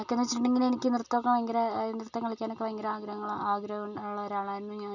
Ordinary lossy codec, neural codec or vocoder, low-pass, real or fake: none; none; 7.2 kHz; real